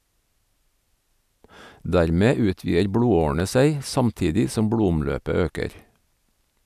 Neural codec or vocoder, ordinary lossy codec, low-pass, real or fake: none; none; 14.4 kHz; real